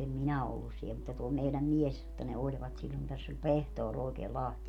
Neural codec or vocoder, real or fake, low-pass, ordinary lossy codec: none; real; 19.8 kHz; none